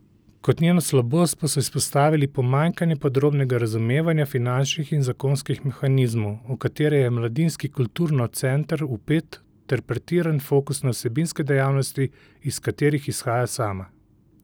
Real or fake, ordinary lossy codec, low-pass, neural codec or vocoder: real; none; none; none